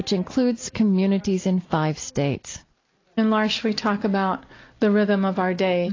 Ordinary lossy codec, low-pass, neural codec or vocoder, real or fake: AAC, 32 kbps; 7.2 kHz; none; real